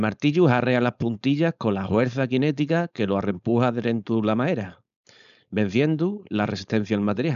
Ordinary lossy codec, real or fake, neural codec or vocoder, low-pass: none; fake; codec, 16 kHz, 4.8 kbps, FACodec; 7.2 kHz